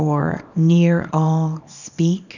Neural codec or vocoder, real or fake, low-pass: codec, 24 kHz, 0.9 kbps, WavTokenizer, small release; fake; 7.2 kHz